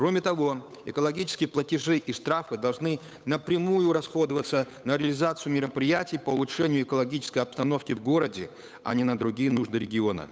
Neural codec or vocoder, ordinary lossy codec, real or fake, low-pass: codec, 16 kHz, 8 kbps, FunCodec, trained on Chinese and English, 25 frames a second; none; fake; none